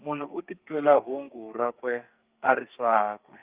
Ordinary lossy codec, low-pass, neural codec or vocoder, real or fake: Opus, 32 kbps; 3.6 kHz; codec, 32 kHz, 1.9 kbps, SNAC; fake